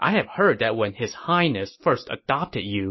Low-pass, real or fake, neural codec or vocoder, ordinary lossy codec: 7.2 kHz; real; none; MP3, 24 kbps